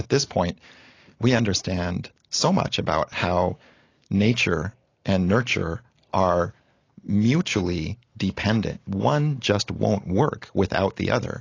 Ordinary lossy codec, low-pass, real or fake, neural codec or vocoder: AAC, 32 kbps; 7.2 kHz; real; none